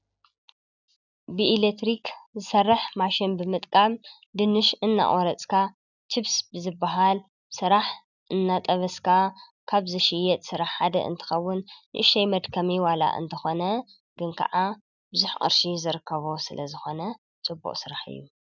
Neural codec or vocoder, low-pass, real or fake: none; 7.2 kHz; real